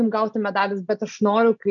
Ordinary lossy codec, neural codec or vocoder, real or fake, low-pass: MP3, 64 kbps; none; real; 7.2 kHz